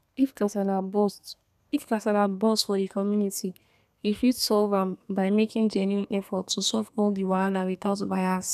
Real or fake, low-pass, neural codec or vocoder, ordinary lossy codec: fake; 14.4 kHz; codec, 32 kHz, 1.9 kbps, SNAC; none